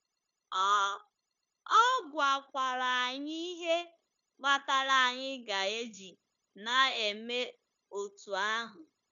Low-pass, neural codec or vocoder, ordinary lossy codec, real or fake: 7.2 kHz; codec, 16 kHz, 0.9 kbps, LongCat-Audio-Codec; none; fake